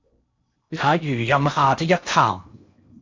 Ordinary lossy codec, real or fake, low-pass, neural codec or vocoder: MP3, 64 kbps; fake; 7.2 kHz; codec, 16 kHz in and 24 kHz out, 0.8 kbps, FocalCodec, streaming, 65536 codes